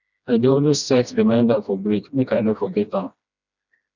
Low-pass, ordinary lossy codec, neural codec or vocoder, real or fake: 7.2 kHz; none; codec, 16 kHz, 1 kbps, FreqCodec, smaller model; fake